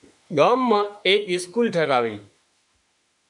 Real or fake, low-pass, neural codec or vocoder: fake; 10.8 kHz; autoencoder, 48 kHz, 32 numbers a frame, DAC-VAE, trained on Japanese speech